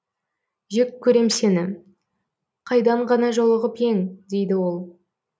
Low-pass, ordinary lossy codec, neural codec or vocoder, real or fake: none; none; none; real